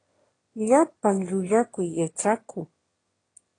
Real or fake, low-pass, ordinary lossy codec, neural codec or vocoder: fake; 9.9 kHz; AAC, 32 kbps; autoencoder, 22.05 kHz, a latent of 192 numbers a frame, VITS, trained on one speaker